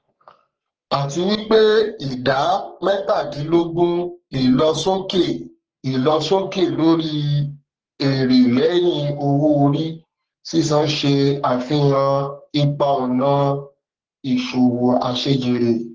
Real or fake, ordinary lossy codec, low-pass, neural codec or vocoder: fake; Opus, 16 kbps; 7.2 kHz; codec, 44.1 kHz, 3.4 kbps, Pupu-Codec